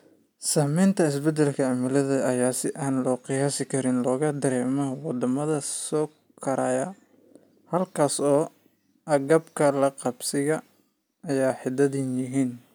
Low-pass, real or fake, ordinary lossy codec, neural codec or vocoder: none; real; none; none